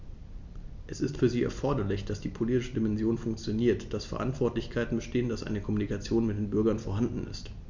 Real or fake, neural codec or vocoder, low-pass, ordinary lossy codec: real; none; 7.2 kHz; MP3, 64 kbps